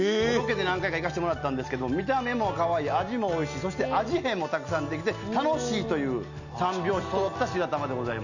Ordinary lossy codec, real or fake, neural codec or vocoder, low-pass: none; real; none; 7.2 kHz